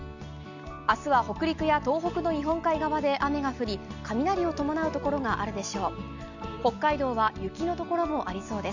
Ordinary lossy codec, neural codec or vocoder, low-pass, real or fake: none; none; 7.2 kHz; real